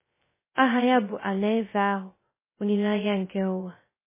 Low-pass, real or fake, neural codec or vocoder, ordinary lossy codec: 3.6 kHz; fake; codec, 16 kHz, 0.2 kbps, FocalCodec; MP3, 16 kbps